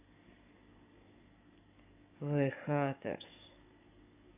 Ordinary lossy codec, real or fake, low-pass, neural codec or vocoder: none; fake; 3.6 kHz; codec, 44.1 kHz, 7.8 kbps, DAC